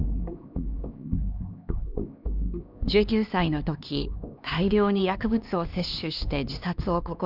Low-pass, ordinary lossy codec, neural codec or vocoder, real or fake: 5.4 kHz; none; codec, 16 kHz, 2 kbps, X-Codec, HuBERT features, trained on LibriSpeech; fake